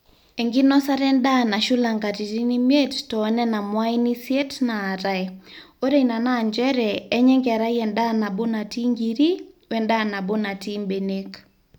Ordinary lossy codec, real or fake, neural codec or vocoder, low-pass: none; real; none; 19.8 kHz